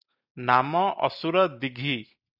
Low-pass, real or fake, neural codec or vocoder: 5.4 kHz; real; none